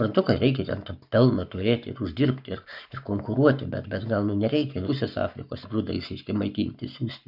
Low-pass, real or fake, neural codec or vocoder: 5.4 kHz; fake; codec, 44.1 kHz, 7.8 kbps, Pupu-Codec